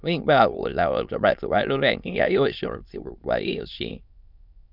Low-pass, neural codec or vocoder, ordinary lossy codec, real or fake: 5.4 kHz; autoencoder, 22.05 kHz, a latent of 192 numbers a frame, VITS, trained on many speakers; none; fake